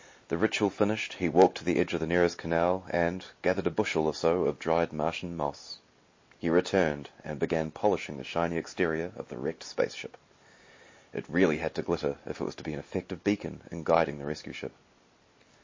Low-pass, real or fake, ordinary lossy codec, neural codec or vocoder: 7.2 kHz; real; MP3, 32 kbps; none